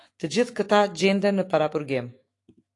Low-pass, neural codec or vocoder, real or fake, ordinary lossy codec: 10.8 kHz; autoencoder, 48 kHz, 128 numbers a frame, DAC-VAE, trained on Japanese speech; fake; AAC, 48 kbps